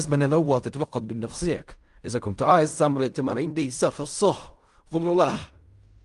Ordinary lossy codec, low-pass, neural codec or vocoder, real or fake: Opus, 32 kbps; 10.8 kHz; codec, 16 kHz in and 24 kHz out, 0.4 kbps, LongCat-Audio-Codec, fine tuned four codebook decoder; fake